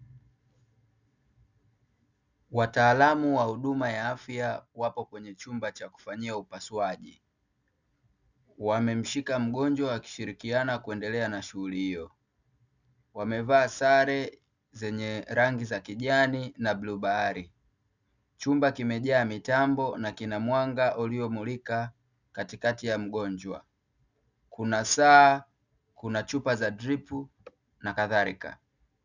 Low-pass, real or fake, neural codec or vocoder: 7.2 kHz; real; none